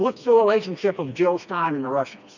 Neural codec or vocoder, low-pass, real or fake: codec, 16 kHz, 2 kbps, FreqCodec, smaller model; 7.2 kHz; fake